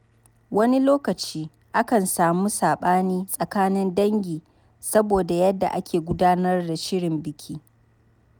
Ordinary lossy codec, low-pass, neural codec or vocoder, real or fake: none; none; none; real